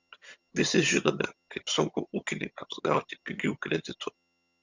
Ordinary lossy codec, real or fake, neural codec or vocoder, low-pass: Opus, 64 kbps; fake; vocoder, 22.05 kHz, 80 mel bands, HiFi-GAN; 7.2 kHz